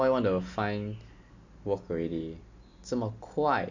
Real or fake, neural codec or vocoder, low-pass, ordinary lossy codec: real; none; 7.2 kHz; Opus, 64 kbps